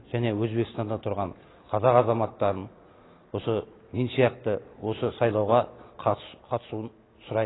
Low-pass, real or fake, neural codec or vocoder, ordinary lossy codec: 7.2 kHz; real; none; AAC, 16 kbps